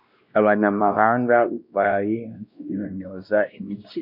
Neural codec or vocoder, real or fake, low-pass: codec, 16 kHz, 1 kbps, X-Codec, WavLM features, trained on Multilingual LibriSpeech; fake; 5.4 kHz